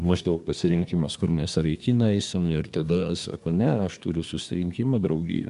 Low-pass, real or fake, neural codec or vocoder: 10.8 kHz; fake; codec, 24 kHz, 1 kbps, SNAC